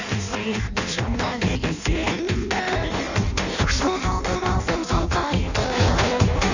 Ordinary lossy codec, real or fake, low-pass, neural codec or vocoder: none; fake; 7.2 kHz; codec, 16 kHz in and 24 kHz out, 0.6 kbps, FireRedTTS-2 codec